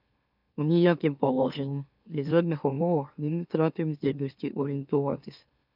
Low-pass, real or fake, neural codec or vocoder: 5.4 kHz; fake; autoencoder, 44.1 kHz, a latent of 192 numbers a frame, MeloTTS